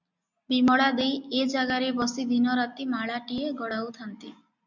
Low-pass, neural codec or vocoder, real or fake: 7.2 kHz; none; real